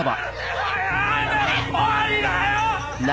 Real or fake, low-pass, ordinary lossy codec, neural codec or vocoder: real; none; none; none